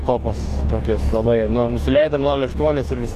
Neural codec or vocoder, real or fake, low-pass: codec, 44.1 kHz, 2.6 kbps, DAC; fake; 14.4 kHz